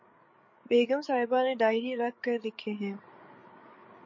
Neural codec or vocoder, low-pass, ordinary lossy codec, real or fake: codec, 16 kHz, 8 kbps, FreqCodec, larger model; 7.2 kHz; MP3, 32 kbps; fake